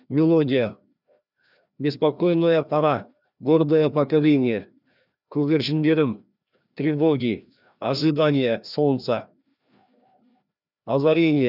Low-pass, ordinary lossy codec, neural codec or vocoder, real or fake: 5.4 kHz; none; codec, 16 kHz, 1 kbps, FreqCodec, larger model; fake